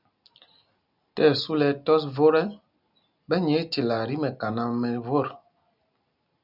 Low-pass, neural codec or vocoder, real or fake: 5.4 kHz; none; real